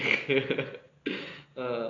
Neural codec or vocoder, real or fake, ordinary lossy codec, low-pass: none; real; AAC, 48 kbps; 7.2 kHz